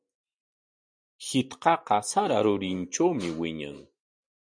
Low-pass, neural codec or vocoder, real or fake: 9.9 kHz; none; real